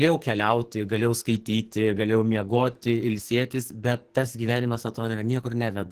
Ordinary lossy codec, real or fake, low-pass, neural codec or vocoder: Opus, 16 kbps; fake; 14.4 kHz; codec, 44.1 kHz, 2.6 kbps, SNAC